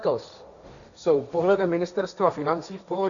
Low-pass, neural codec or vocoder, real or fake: 7.2 kHz; codec, 16 kHz, 1.1 kbps, Voila-Tokenizer; fake